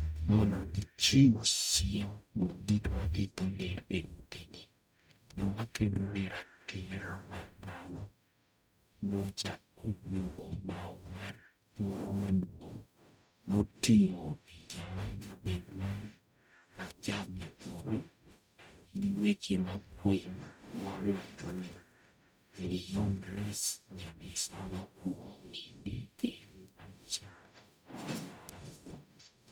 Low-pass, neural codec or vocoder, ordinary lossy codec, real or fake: none; codec, 44.1 kHz, 0.9 kbps, DAC; none; fake